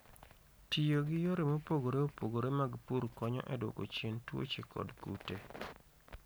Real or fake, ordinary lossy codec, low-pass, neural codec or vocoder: real; none; none; none